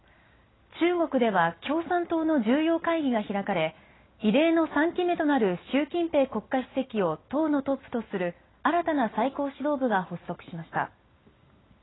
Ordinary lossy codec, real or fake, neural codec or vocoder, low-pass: AAC, 16 kbps; real; none; 7.2 kHz